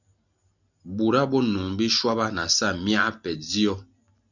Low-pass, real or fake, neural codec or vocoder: 7.2 kHz; real; none